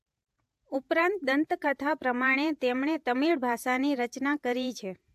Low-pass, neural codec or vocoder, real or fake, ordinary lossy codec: 14.4 kHz; vocoder, 48 kHz, 128 mel bands, Vocos; fake; AAC, 96 kbps